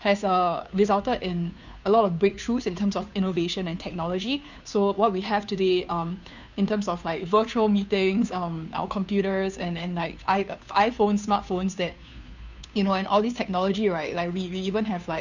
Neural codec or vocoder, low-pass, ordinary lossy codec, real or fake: codec, 16 kHz, 2 kbps, FunCodec, trained on Chinese and English, 25 frames a second; 7.2 kHz; none; fake